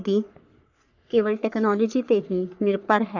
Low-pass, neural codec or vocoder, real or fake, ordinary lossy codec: 7.2 kHz; codec, 44.1 kHz, 3.4 kbps, Pupu-Codec; fake; none